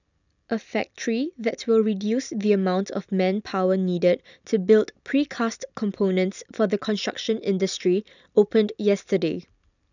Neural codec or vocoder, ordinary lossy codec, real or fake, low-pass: none; none; real; 7.2 kHz